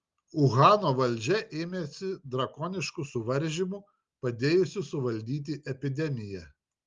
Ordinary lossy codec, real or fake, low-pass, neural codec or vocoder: Opus, 32 kbps; real; 7.2 kHz; none